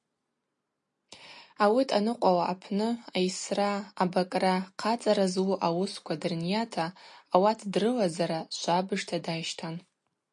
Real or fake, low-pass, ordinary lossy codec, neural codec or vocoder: real; 10.8 kHz; MP3, 48 kbps; none